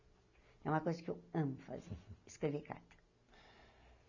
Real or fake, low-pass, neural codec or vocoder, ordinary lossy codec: real; 7.2 kHz; none; none